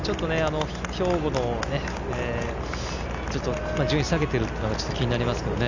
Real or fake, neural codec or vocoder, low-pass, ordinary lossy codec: real; none; 7.2 kHz; none